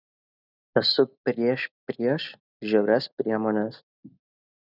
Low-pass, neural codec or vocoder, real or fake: 5.4 kHz; none; real